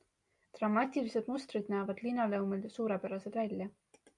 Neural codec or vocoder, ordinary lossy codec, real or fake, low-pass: none; AAC, 64 kbps; real; 10.8 kHz